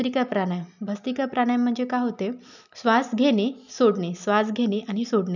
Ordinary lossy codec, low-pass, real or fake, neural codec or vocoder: none; 7.2 kHz; real; none